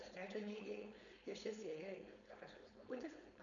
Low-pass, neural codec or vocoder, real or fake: 7.2 kHz; codec, 16 kHz, 4.8 kbps, FACodec; fake